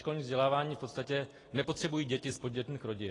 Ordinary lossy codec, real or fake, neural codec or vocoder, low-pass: AAC, 32 kbps; real; none; 10.8 kHz